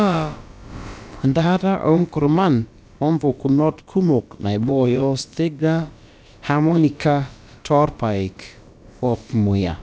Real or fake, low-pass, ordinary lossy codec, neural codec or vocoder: fake; none; none; codec, 16 kHz, about 1 kbps, DyCAST, with the encoder's durations